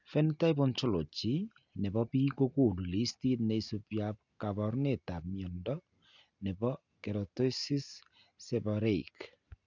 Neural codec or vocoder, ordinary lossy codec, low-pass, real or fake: none; none; 7.2 kHz; real